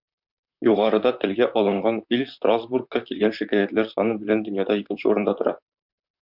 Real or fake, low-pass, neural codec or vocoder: fake; 5.4 kHz; vocoder, 44.1 kHz, 128 mel bands, Pupu-Vocoder